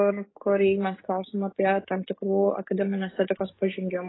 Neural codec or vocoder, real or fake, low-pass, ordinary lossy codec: codec, 44.1 kHz, 7.8 kbps, DAC; fake; 7.2 kHz; AAC, 16 kbps